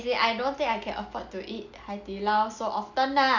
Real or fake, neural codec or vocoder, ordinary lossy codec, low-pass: real; none; none; 7.2 kHz